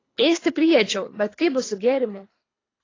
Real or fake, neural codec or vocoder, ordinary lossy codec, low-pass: fake; codec, 24 kHz, 3 kbps, HILCodec; AAC, 32 kbps; 7.2 kHz